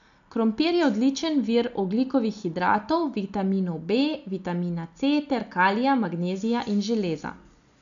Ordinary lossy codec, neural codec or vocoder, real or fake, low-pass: none; none; real; 7.2 kHz